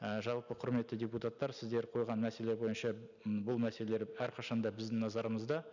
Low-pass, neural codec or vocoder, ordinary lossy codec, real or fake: 7.2 kHz; none; none; real